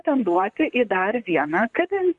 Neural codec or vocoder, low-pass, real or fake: vocoder, 44.1 kHz, 128 mel bands, Pupu-Vocoder; 10.8 kHz; fake